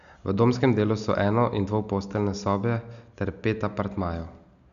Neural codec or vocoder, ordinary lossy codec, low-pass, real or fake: none; none; 7.2 kHz; real